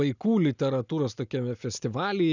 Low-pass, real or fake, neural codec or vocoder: 7.2 kHz; real; none